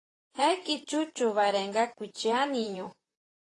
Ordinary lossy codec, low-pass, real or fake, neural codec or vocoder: AAC, 32 kbps; 10.8 kHz; fake; vocoder, 44.1 kHz, 128 mel bands, Pupu-Vocoder